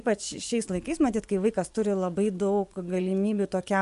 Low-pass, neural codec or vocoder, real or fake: 10.8 kHz; vocoder, 24 kHz, 100 mel bands, Vocos; fake